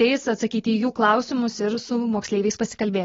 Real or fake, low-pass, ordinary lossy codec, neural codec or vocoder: real; 7.2 kHz; AAC, 24 kbps; none